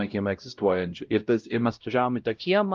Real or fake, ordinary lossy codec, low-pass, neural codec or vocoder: fake; Opus, 24 kbps; 7.2 kHz; codec, 16 kHz, 0.5 kbps, X-Codec, WavLM features, trained on Multilingual LibriSpeech